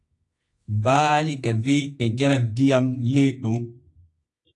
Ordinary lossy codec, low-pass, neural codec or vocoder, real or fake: AAC, 48 kbps; 10.8 kHz; codec, 24 kHz, 0.9 kbps, WavTokenizer, medium music audio release; fake